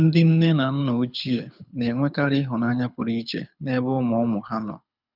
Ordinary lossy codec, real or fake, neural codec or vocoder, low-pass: none; fake; codec, 24 kHz, 6 kbps, HILCodec; 5.4 kHz